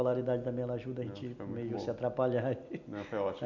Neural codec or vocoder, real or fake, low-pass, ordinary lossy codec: none; real; 7.2 kHz; none